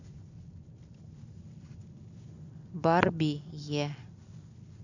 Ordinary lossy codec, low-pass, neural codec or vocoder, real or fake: none; 7.2 kHz; none; real